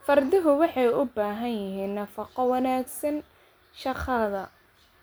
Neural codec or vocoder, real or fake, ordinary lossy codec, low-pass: none; real; none; none